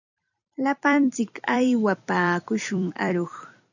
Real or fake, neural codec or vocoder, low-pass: fake; vocoder, 44.1 kHz, 128 mel bands every 256 samples, BigVGAN v2; 7.2 kHz